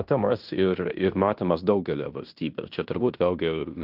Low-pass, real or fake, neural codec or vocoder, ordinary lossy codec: 5.4 kHz; fake; codec, 16 kHz in and 24 kHz out, 0.9 kbps, LongCat-Audio-Codec, fine tuned four codebook decoder; Opus, 24 kbps